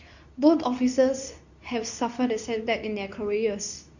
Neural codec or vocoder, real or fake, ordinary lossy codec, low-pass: codec, 24 kHz, 0.9 kbps, WavTokenizer, medium speech release version 2; fake; none; 7.2 kHz